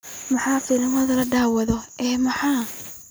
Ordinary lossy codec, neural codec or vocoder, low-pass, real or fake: none; none; none; real